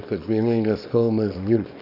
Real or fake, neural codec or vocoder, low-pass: fake; codec, 24 kHz, 0.9 kbps, WavTokenizer, small release; 5.4 kHz